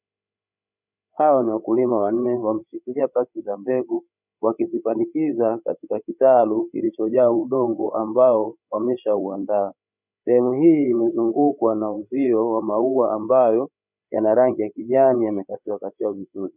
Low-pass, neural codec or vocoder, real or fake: 3.6 kHz; codec, 16 kHz, 8 kbps, FreqCodec, larger model; fake